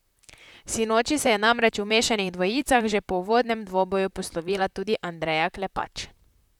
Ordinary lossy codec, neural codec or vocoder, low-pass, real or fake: none; vocoder, 44.1 kHz, 128 mel bands, Pupu-Vocoder; 19.8 kHz; fake